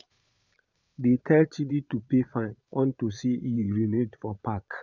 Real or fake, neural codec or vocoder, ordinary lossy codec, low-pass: fake; vocoder, 22.05 kHz, 80 mel bands, Vocos; none; 7.2 kHz